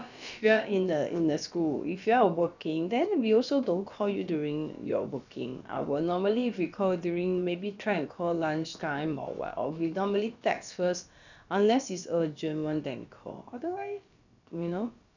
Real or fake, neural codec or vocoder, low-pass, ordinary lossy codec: fake; codec, 16 kHz, about 1 kbps, DyCAST, with the encoder's durations; 7.2 kHz; none